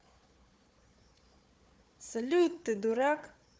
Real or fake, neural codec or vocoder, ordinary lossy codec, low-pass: fake; codec, 16 kHz, 4 kbps, FunCodec, trained on Chinese and English, 50 frames a second; none; none